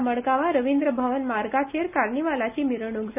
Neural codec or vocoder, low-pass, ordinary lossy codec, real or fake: none; 3.6 kHz; none; real